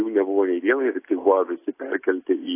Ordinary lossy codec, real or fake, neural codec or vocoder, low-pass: AAC, 24 kbps; real; none; 3.6 kHz